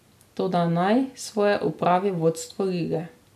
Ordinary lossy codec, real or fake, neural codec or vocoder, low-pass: MP3, 96 kbps; real; none; 14.4 kHz